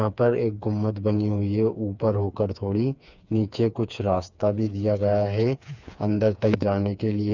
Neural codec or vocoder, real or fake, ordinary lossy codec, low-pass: codec, 16 kHz, 4 kbps, FreqCodec, smaller model; fake; none; 7.2 kHz